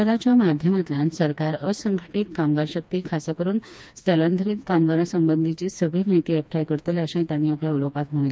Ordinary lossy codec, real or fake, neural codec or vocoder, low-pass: none; fake; codec, 16 kHz, 2 kbps, FreqCodec, smaller model; none